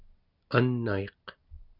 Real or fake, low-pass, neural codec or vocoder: real; 5.4 kHz; none